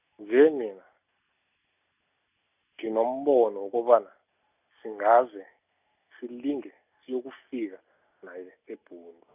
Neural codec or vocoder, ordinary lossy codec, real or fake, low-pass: none; none; real; 3.6 kHz